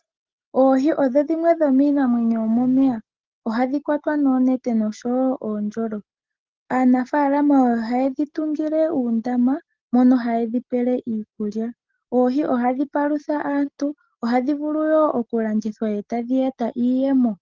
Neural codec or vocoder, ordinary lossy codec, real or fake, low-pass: none; Opus, 16 kbps; real; 7.2 kHz